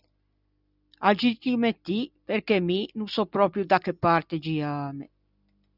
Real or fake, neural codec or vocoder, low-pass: real; none; 5.4 kHz